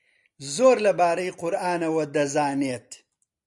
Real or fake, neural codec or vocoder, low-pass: real; none; 10.8 kHz